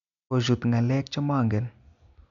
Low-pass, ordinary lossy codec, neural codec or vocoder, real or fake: 7.2 kHz; none; none; real